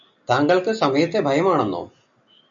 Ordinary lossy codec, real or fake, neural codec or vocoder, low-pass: MP3, 64 kbps; real; none; 7.2 kHz